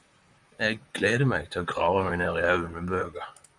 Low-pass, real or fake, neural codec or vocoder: 10.8 kHz; fake; vocoder, 44.1 kHz, 128 mel bands, Pupu-Vocoder